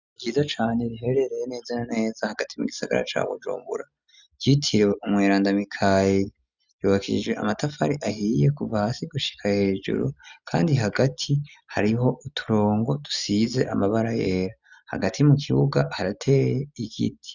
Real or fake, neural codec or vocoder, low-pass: real; none; 7.2 kHz